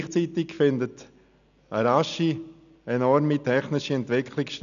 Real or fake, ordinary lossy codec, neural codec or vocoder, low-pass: real; none; none; 7.2 kHz